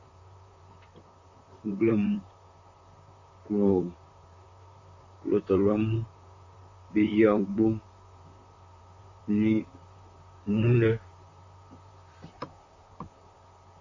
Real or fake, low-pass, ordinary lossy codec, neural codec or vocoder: fake; 7.2 kHz; AAC, 48 kbps; vocoder, 44.1 kHz, 128 mel bands, Pupu-Vocoder